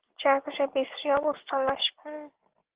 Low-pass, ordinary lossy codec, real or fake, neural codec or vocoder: 3.6 kHz; Opus, 16 kbps; real; none